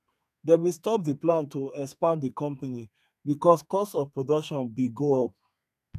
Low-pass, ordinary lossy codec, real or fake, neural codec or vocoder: 14.4 kHz; none; fake; codec, 32 kHz, 1.9 kbps, SNAC